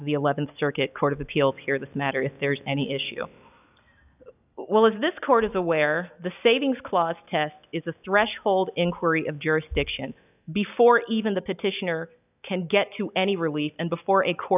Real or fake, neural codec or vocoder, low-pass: real; none; 3.6 kHz